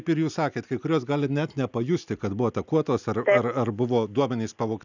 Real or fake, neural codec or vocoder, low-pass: real; none; 7.2 kHz